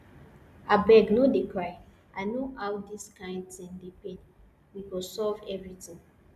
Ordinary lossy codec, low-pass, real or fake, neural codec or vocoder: none; 14.4 kHz; real; none